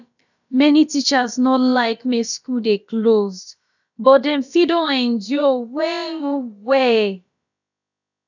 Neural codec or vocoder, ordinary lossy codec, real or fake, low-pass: codec, 16 kHz, about 1 kbps, DyCAST, with the encoder's durations; none; fake; 7.2 kHz